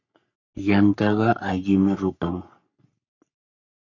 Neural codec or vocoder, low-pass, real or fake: codec, 44.1 kHz, 3.4 kbps, Pupu-Codec; 7.2 kHz; fake